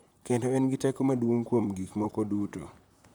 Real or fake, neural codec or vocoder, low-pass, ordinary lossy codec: fake; vocoder, 44.1 kHz, 128 mel bands, Pupu-Vocoder; none; none